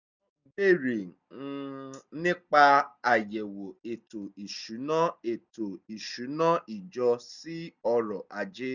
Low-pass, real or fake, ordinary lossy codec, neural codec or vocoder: 7.2 kHz; real; none; none